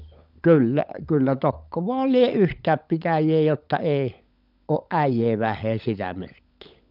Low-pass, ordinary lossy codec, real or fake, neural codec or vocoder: 5.4 kHz; none; fake; codec, 16 kHz, 16 kbps, FunCodec, trained on LibriTTS, 50 frames a second